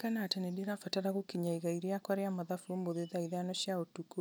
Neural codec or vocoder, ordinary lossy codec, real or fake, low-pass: none; none; real; none